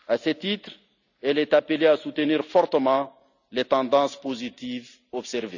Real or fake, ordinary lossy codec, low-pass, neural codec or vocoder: real; none; 7.2 kHz; none